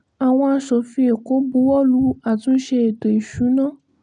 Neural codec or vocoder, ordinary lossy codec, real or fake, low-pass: none; none; real; 9.9 kHz